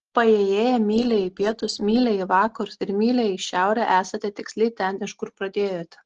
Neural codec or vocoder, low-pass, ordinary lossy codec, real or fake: none; 7.2 kHz; Opus, 16 kbps; real